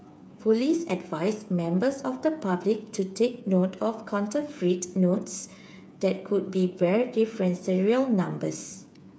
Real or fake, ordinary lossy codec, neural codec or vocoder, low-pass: fake; none; codec, 16 kHz, 8 kbps, FreqCodec, smaller model; none